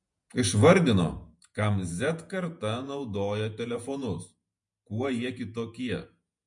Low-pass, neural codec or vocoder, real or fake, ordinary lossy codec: 10.8 kHz; vocoder, 48 kHz, 128 mel bands, Vocos; fake; MP3, 48 kbps